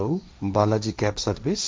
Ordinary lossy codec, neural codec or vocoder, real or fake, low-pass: none; codec, 16 kHz, 1.1 kbps, Voila-Tokenizer; fake; none